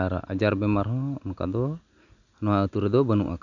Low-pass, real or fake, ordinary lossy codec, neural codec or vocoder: 7.2 kHz; real; none; none